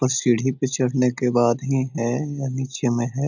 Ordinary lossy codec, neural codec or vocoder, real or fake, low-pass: none; none; real; 7.2 kHz